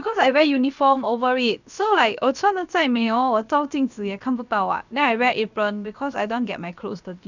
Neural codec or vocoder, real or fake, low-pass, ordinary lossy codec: codec, 16 kHz, about 1 kbps, DyCAST, with the encoder's durations; fake; 7.2 kHz; none